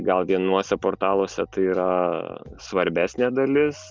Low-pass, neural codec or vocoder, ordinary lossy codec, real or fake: 7.2 kHz; none; Opus, 24 kbps; real